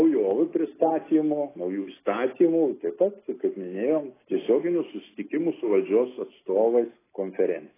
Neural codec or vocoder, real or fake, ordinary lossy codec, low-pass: none; real; AAC, 16 kbps; 3.6 kHz